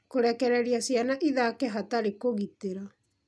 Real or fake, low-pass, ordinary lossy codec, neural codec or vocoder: real; none; none; none